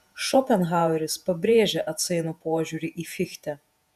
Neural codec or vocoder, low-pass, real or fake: vocoder, 48 kHz, 128 mel bands, Vocos; 14.4 kHz; fake